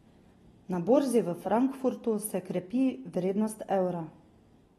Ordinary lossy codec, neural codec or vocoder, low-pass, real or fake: AAC, 32 kbps; none; 19.8 kHz; real